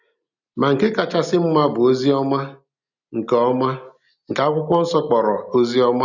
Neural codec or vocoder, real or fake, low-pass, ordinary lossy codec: none; real; 7.2 kHz; none